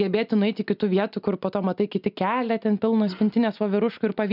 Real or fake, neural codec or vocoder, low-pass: real; none; 5.4 kHz